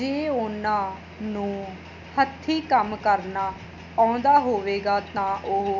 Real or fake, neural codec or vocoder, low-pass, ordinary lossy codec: real; none; 7.2 kHz; none